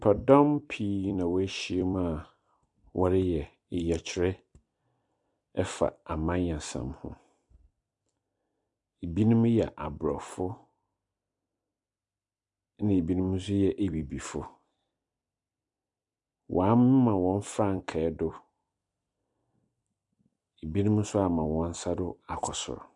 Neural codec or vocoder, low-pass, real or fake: vocoder, 44.1 kHz, 128 mel bands every 256 samples, BigVGAN v2; 10.8 kHz; fake